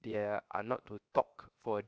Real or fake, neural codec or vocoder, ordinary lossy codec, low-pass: fake; codec, 16 kHz, 0.7 kbps, FocalCodec; none; none